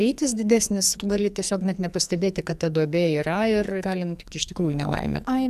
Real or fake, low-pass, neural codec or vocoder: fake; 14.4 kHz; codec, 44.1 kHz, 2.6 kbps, SNAC